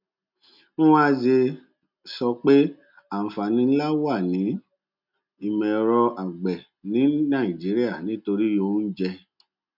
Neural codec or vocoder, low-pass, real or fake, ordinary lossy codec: none; 5.4 kHz; real; none